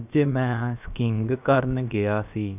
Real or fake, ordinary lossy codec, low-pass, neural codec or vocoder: fake; none; 3.6 kHz; codec, 16 kHz, about 1 kbps, DyCAST, with the encoder's durations